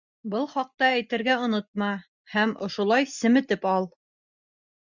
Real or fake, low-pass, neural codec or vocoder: real; 7.2 kHz; none